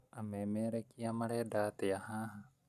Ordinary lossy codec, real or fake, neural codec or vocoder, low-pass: none; real; none; 14.4 kHz